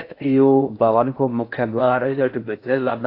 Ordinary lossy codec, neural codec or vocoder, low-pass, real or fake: AAC, 32 kbps; codec, 16 kHz in and 24 kHz out, 0.6 kbps, FocalCodec, streaming, 4096 codes; 5.4 kHz; fake